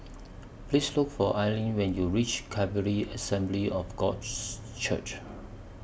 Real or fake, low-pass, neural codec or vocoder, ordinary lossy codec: real; none; none; none